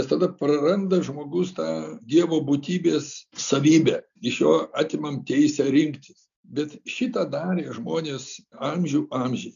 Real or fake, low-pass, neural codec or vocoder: real; 7.2 kHz; none